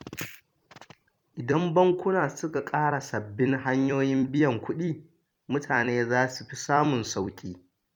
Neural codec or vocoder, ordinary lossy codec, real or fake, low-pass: vocoder, 44.1 kHz, 128 mel bands every 256 samples, BigVGAN v2; MP3, 96 kbps; fake; 19.8 kHz